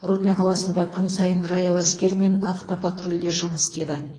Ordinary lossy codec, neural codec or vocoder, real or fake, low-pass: AAC, 32 kbps; codec, 24 kHz, 1.5 kbps, HILCodec; fake; 9.9 kHz